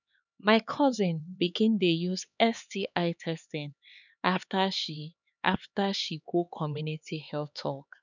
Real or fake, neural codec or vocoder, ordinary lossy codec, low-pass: fake; codec, 16 kHz, 4 kbps, X-Codec, HuBERT features, trained on LibriSpeech; none; 7.2 kHz